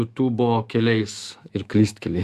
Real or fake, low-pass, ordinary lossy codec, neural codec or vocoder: fake; 14.4 kHz; Opus, 64 kbps; autoencoder, 48 kHz, 128 numbers a frame, DAC-VAE, trained on Japanese speech